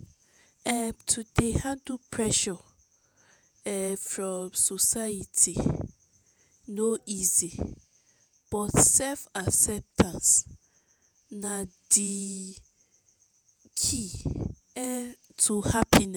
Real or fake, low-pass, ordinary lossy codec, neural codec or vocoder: fake; none; none; vocoder, 48 kHz, 128 mel bands, Vocos